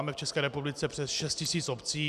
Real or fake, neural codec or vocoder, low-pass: real; none; 14.4 kHz